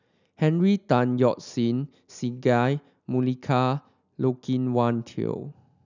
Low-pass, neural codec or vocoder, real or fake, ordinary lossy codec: 7.2 kHz; none; real; none